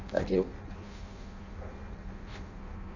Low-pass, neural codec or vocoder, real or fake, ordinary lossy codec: 7.2 kHz; codec, 16 kHz in and 24 kHz out, 0.6 kbps, FireRedTTS-2 codec; fake; none